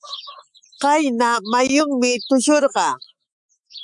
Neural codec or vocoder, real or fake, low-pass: autoencoder, 48 kHz, 128 numbers a frame, DAC-VAE, trained on Japanese speech; fake; 10.8 kHz